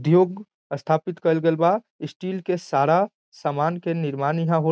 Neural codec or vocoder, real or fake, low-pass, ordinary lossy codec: none; real; none; none